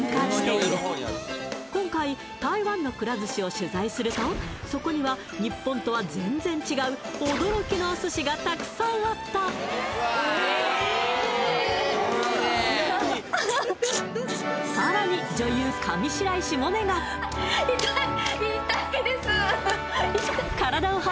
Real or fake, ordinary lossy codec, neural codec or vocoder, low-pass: real; none; none; none